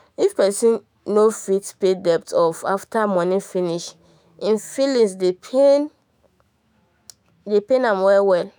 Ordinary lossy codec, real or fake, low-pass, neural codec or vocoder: none; fake; none; autoencoder, 48 kHz, 128 numbers a frame, DAC-VAE, trained on Japanese speech